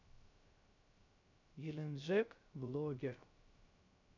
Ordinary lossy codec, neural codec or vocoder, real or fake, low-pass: none; codec, 16 kHz, 0.3 kbps, FocalCodec; fake; 7.2 kHz